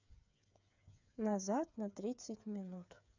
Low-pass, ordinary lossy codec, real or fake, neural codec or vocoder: 7.2 kHz; none; fake; codec, 16 kHz, 8 kbps, FreqCodec, smaller model